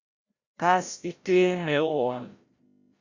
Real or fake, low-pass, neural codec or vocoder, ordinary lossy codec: fake; 7.2 kHz; codec, 16 kHz, 0.5 kbps, FreqCodec, larger model; Opus, 64 kbps